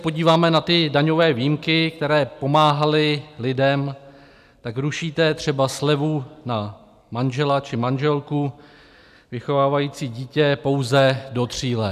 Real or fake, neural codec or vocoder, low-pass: real; none; 14.4 kHz